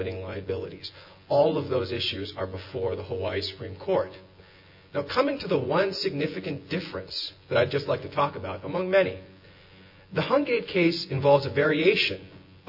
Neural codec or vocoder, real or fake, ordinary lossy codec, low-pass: vocoder, 24 kHz, 100 mel bands, Vocos; fake; MP3, 32 kbps; 5.4 kHz